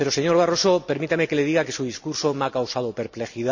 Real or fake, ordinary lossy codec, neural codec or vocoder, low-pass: real; none; none; 7.2 kHz